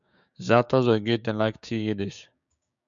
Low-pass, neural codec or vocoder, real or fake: 7.2 kHz; codec, 16 kHz, 6 kbps, DAC; fake